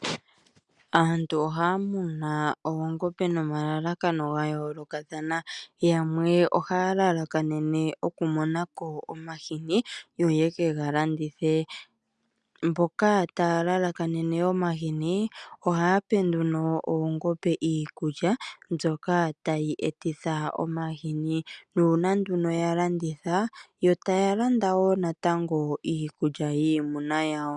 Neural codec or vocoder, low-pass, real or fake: none; 10.8 kHz; real